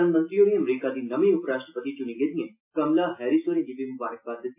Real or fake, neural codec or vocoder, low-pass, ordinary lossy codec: real; none; 3.6 kHz; MP3, 24 kbps